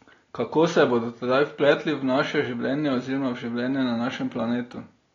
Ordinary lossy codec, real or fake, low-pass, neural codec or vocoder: AAC, 32 kbps; real; 7.2 kHz; none